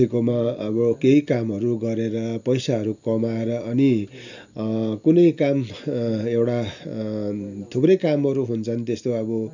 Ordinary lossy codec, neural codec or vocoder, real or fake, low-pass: none; none; real; 7.2 kHz